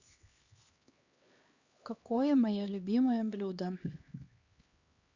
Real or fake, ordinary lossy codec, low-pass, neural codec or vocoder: fake; Opus, 64 kbps; 7.2 kHz; codec, 16 kHz, 2 kbps, X-Codec, HuBERT features, trained on LibriSpeech